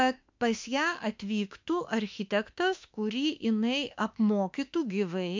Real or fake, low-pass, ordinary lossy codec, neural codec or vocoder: fake; 7.2 kHz; MP3, 64 kbps; autoencoder, 48 kHz, 32 numbers a frame, DAC-VAE, trained on Japanese speech